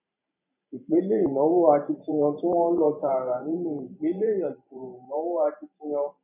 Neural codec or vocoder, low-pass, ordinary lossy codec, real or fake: vocoder, 44.1 kHz, 128 mel bands every 512 samples, BigVGAN v2; 3.6 kHz; none; fake